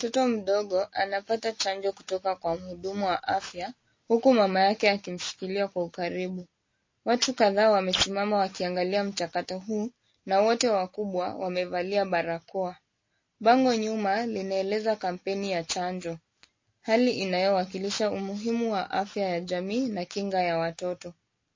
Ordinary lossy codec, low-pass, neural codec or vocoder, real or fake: MP3, 32 kbps; 7.2 kHz; none; real